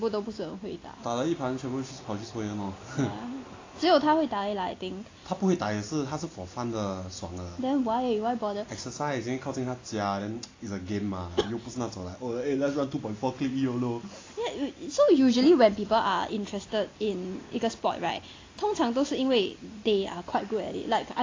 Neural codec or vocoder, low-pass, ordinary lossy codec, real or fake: none; 7.2 kHz; AAC, 32 kbps; real